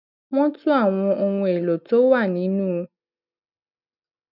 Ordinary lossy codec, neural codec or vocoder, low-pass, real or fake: none; none; 5.4 kHz; real